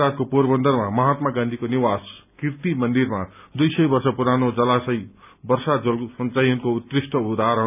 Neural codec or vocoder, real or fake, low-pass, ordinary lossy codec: none; real; 3.6 kHz; none